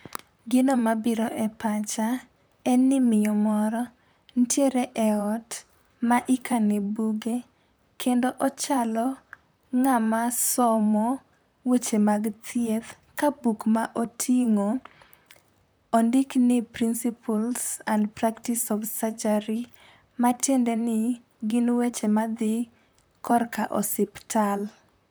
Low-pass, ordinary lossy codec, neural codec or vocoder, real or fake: none; none; vocoder, 44.1 kHz, 128 mel bands, Pupu-Vocoder; fake